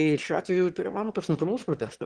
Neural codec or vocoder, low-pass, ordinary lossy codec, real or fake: autoencoder, 22.05 kHz, a latent of 192 numbers a frame, VITS, trained on one speaker; 9.9 kHz; Opus, 16 kbps; fake